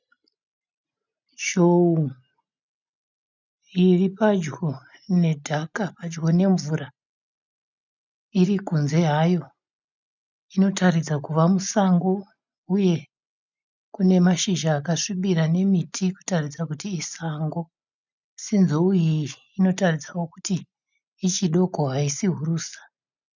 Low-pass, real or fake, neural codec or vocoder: 7.2 kHz; real; none